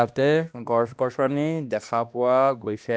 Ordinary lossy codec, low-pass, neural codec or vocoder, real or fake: none; none; codec, 16 kHz, 1 kbps, X-Codec, HuBERT features, trained on balanced general audio; fake